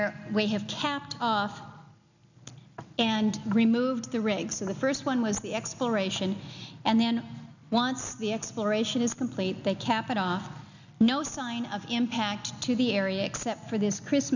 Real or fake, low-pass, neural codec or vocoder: real; 7.2 kHz; none